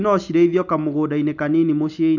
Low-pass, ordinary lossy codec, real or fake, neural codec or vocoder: 7.2 kHz; none; real; none